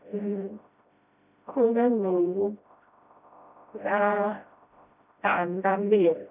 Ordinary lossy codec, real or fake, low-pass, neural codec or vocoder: none; fake; 3.6 kHz; codec, 16 kHz, 0.5 kbps, FreqCodec, smaller model